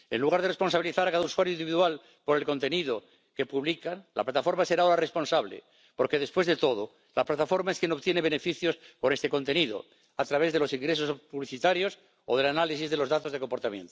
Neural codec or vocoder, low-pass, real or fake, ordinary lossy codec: none; none; real; none